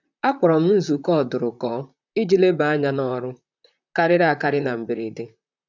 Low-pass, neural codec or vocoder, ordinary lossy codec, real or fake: 7.2 kHz; vocoder, 44.1 kHz, 128 mel bands, Pupu-Vocoder; none; fake